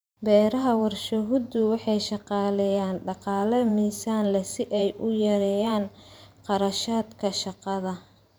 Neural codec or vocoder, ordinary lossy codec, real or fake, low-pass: vocoder, 44.1 kHz, 128 mel bands every 512 samples, BigVGAN v2; none; fake; none